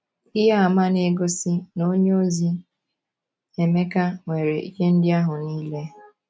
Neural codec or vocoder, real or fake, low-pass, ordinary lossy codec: none; real; none; none